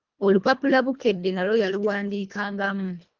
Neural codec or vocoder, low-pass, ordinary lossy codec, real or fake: codec, 24 kHz, 1.5 kbps, HILCodec; 7.2 kHz; Opus, 24 kbps; fake